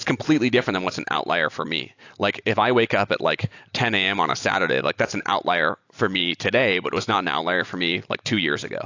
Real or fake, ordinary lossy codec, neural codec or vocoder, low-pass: real; AAC, 48 kbps; none; 7.2 kHz